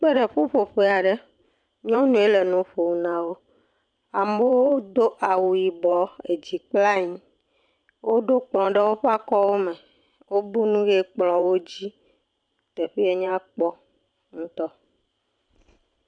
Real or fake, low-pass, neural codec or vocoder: fake; 9.9 kHz; vocoder, 44.1 kHz, 128 mel bands every 512 samples, BigVGAN v2